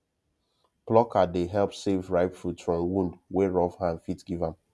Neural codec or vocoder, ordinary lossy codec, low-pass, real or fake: none; none; none; real